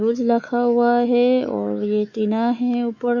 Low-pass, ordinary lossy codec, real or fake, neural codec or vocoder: 7.2 kHz; Opus, 64 kbps; fake; autoencoder, 48 kHz, 128 numbers a frame, DAC-VAE, trained on Japanese speech